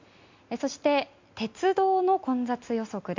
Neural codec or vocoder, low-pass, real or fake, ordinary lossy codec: none; 7.2 kHz; real; MP3, 48 kbps